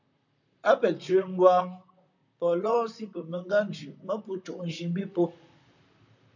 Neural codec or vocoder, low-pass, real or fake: vocoder, 44.1 kHz, 128 mel bands, Pupu-Vocoder; 7.2 kHz; fake